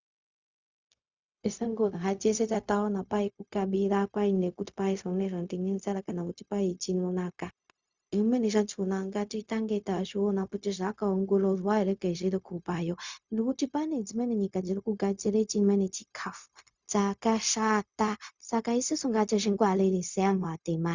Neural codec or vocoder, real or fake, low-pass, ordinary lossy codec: codec, 16 kHz, 0.4 kbps, LongCat-Audio-Codec; fake; 7.2 kHz; Opus, 64 kbps